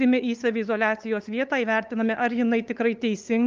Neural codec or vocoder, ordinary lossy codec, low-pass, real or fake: codec, 16 kHz, 8 kbps, FunCodec, trained on LibriTTS, 25 frames a second; Opus, 24 kbps; 7.2 kHz; fake